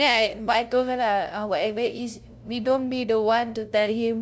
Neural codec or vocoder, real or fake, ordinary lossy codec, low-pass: codec, 16 kHz, 0.5 kbps, FunCodec, trained on LibriTTS, 25 frames a second; fake; none; none